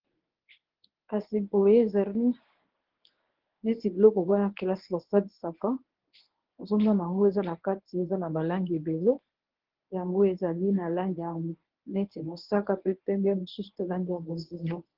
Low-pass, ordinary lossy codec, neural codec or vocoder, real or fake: 5.4 kHz; Opus, 16 kbps; codec, 24 kHz, 0.9 kbps, WavTokenizer, medium speech release version 1; fake